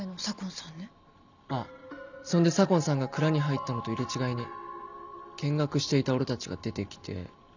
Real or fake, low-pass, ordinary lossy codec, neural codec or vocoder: real; 7.2 kHz; none; none